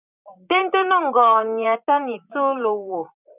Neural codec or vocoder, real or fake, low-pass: vocoder, 44.1 kHz, 128 mel bands, Pupu-Vocoder; fake; 3.6 kHz